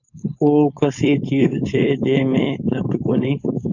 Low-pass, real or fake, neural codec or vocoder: 7.2 kHz; fake; codec, 16 kHz, 4.8 kbps, FACodec